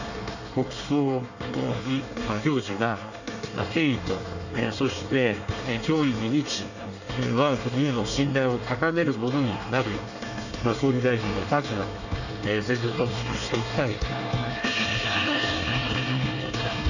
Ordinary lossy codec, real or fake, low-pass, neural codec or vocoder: none; fake; 7.2 kHz; codec, 24 kHz, 1 kbps, SNAC